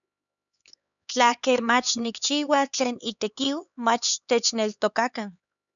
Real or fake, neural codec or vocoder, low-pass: fake; codec, 16 kHz, 4 kbps, X-Codec, HuBERT features, trained on LibriSpeech; 7.2 kHz